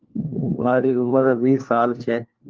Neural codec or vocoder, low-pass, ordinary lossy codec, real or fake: codec, 16 kHz, 1 kbps, FunCodec, trained on LibriTTS, 50 frames a second; 7.2 kHz; Opus, 32 kbps; fake